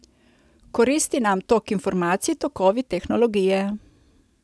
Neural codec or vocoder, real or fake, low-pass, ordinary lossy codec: none; real; none; none